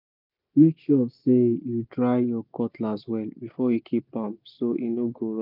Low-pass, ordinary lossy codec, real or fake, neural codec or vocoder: 5.4 kHz; none; real; none